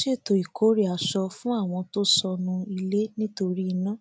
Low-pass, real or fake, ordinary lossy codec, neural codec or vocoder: none; real; none; none